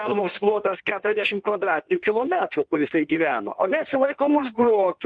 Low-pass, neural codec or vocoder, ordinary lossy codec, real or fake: 9.9 kHz; codec, 16 kHz in and 24 kHz out, 1.1 kbps, FireRedTTS-2 codec; Opus, 24 kbps; fake